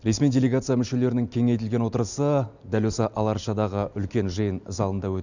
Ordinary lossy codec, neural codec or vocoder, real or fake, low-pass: none; none; real; 7.2 kHz